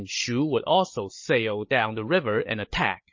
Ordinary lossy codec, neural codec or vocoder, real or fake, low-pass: MP3, 32 kbps; codec, 16 kHz, 16 kbps, FreqCodec, larger model; fake; 7.2 kHz